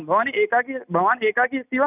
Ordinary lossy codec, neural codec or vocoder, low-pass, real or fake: none; none; 3.6 kHz; real